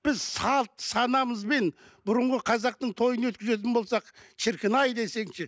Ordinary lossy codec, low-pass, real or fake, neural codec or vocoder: none; none; real; none